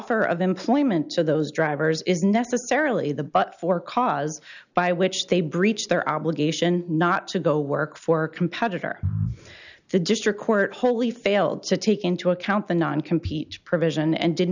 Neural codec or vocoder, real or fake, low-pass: none; real; 7.2 kHz